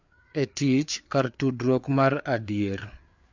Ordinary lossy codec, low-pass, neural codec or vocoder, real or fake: MP3, 64 kbps; 7.2 kHz; codec, 16 kHz, 4 kbps, FreqCodec, larger model; fake